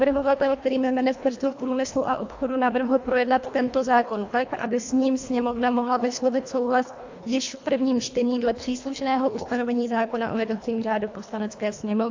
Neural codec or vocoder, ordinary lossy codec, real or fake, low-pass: codec, 24 kHz, 1.5 kbps, HILCodec; AAC, 48 kbps; fake; 7.2 kHz